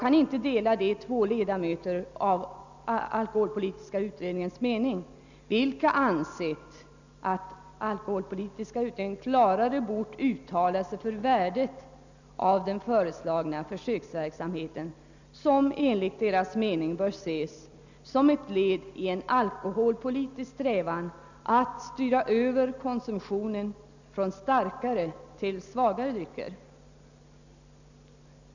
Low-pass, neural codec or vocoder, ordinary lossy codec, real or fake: 7.2 kHz; none; none; real